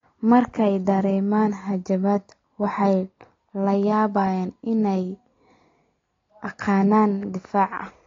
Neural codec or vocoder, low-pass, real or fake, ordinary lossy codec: none; 7.2 kHz; real; AAC, 32 kbps